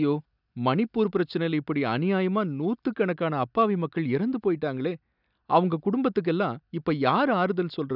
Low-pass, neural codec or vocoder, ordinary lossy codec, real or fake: 5.4 kHz; none; none; real